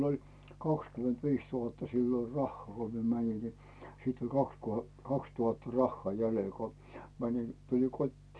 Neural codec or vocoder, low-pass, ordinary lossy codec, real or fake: none; none; none; real